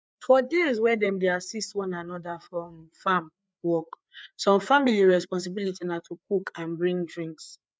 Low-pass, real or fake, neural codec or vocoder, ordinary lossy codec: none; fake; codec, 16 kHz, 4 kbps, FreqCodec, larger model; none